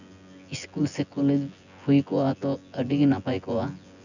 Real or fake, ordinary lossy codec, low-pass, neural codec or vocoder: fake; none; 7.2 kHz; vocoder, 24 kHz, 100 mel bands, Vocos